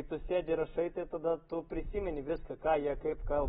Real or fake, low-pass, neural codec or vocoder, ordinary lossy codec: real; 19.8 kHz; none; AAC, 16 kbps